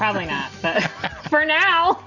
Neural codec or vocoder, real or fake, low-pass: none; real; 7.2 kHz